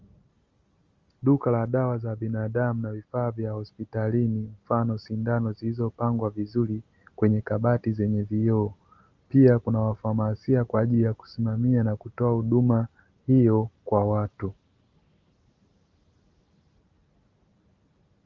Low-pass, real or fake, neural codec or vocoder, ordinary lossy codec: 7.2 kHz; real; none; Opus, 32 kbps